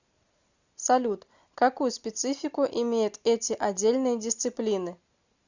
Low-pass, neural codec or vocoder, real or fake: 7.2 kHz; none; real